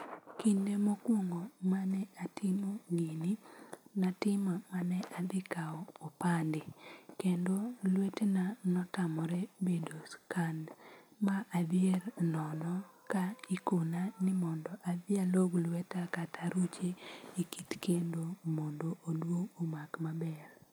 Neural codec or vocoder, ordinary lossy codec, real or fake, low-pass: none; none; real; none